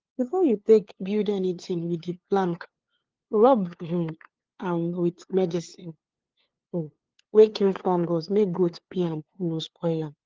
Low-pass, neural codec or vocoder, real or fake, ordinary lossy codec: 7.2 kHz; codec, 16 kHz, 2 kbps, FunCodec, trained on LibriTTS, 25 frames a second; fake; Opus, 16 kbps